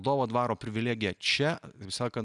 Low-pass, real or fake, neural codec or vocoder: 10.8 kHz; real; none